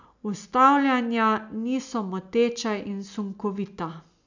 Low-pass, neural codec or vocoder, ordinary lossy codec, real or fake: 7.2 kHz; none; none; real